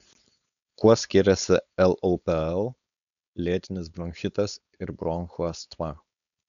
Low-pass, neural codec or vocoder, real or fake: 7.2 kHz; codec, 16 kHz, 4.8 kbps, FACodec; fake